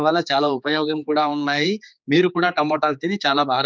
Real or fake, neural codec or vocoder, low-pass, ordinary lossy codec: fake; codec, 16 kHz, 4 kbps, X-Codec, HuBERT features, trained on general audio; none; none